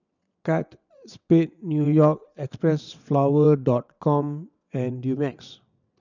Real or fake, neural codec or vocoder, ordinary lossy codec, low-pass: fake; vocoder, 22.05 kHz, 80 mel bands, WaveNeXt; none; 7.2 kHz